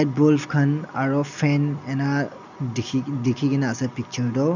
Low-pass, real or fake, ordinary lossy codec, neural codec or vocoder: 7.2 kHz; real; none; none